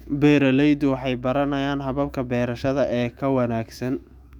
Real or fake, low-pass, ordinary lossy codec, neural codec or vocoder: fake; 19.8 kHz; none; autoencoder, 48 kHz, 128 numbers a frame, DAC-VAE, trained on Japanese speech